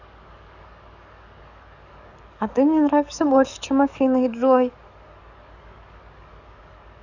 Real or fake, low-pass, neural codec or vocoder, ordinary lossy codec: fake; 7.2 kHz; codec, 44.1 kHz, 7.8 kbps, DAC; none